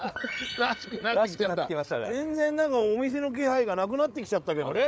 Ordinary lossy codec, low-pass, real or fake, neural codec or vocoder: none; none; fake; codec, 16 kHz, 8 kbps, FreqCodec, larger model